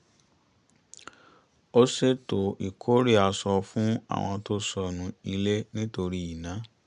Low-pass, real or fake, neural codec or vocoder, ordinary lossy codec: 9.9 kHz; real; none; none